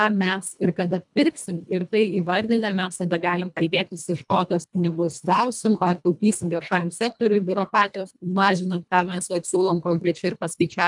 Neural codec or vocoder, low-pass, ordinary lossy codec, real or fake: codec, 24 kHz, 1.5 kbps, HILCodec; 10.8 kHz; MP3, 96 kbps; fake